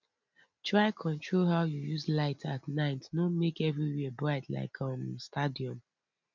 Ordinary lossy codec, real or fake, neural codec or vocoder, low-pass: none; real; none; 7.2 kHz